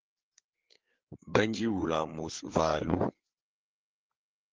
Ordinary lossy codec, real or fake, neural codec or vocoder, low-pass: Opus, 16 kbps; fake; codec, 16 kHz, 4 kbps, FreqCodec, larger model; 7.2 kHz